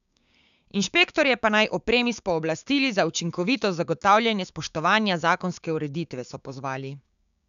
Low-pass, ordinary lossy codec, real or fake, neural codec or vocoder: 7.2 kHz; none; fake; codec, 16 kHz, 6 kbps, DAC